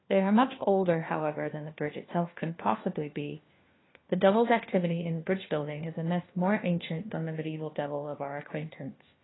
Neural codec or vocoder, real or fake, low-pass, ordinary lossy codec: codec, 16 kHz, 1 kbps, FunCodec, trained on LibriTTS, 50 frames a second; fake; 7.2 kHz; AAC, 16 kbps